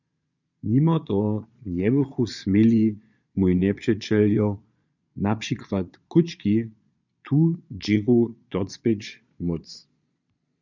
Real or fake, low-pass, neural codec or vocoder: fake; 7.2 kHz; vocoder, 22.05 kHz, 80 mel bands, Vocos